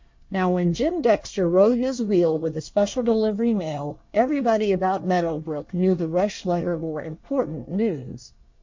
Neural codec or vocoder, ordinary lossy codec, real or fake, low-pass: codec, 24 kHz, 1 kbps, SNAC; MP3, 48 kbps; fake; 7.2 kHz